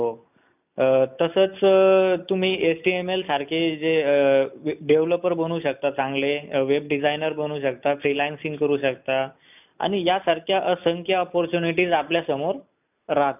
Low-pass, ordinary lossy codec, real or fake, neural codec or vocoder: 3.6 kHz; none; real; none